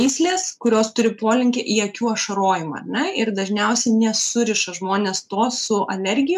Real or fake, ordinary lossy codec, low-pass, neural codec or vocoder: real; MP3, 96 kbps; 14.4 kHz; none